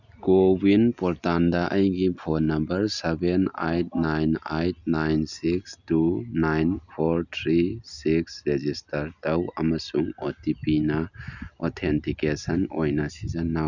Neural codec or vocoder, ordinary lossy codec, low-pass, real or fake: none; none; 7.2 kHz; real